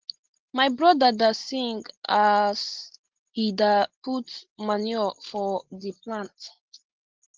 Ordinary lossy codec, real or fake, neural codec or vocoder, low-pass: Opus, 16 kbps; real; none; 7.2 kHz